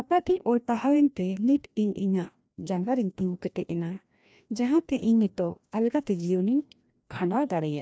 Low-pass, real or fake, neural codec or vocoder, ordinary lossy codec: none; fake; codec, 16 kHz, 1 kbps, FreqCodec, larger model; none